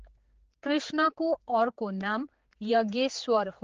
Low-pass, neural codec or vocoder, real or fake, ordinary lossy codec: 7.2 kHz; codec, 16 kHz, 4 kbps, X-Codec, HuBERT features, trained on general audio; fake; Opus, 16 kbps